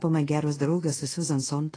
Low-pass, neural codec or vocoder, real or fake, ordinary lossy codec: 9.9 kHz; codec, 24 kHz, 0.5 kbps, DualCodec; fake; AAC, 32 kbps